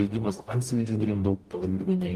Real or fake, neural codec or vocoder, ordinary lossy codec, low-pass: fake; codec, 44.1 kHz, 0.9 kbps, DAC; Opus, 32 kbps; 14.4 kHz